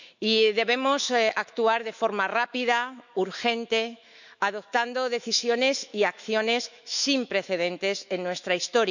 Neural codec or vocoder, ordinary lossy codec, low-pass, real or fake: autoencoder, 48 kHz, 128 numbers a frame, DAC-VAE, trained on Japanese speech; none; 7.2 kHz; fake